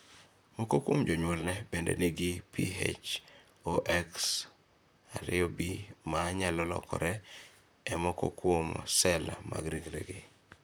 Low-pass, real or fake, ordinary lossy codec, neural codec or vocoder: none; fake; none; vocoder, 44.1 kHz, 128 mel bands, Pupu-Vocoder